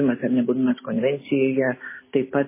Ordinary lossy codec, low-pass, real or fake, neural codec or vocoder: MP3, 16 kbps; 3.6 kHz; real; none